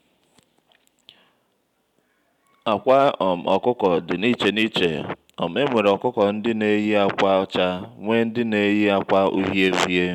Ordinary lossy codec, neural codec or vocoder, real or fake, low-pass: none; none; real; 19.8 kHz